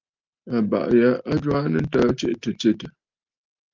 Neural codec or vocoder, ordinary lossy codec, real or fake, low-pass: none; Opus, 24 kbps; real; 7.2 kHz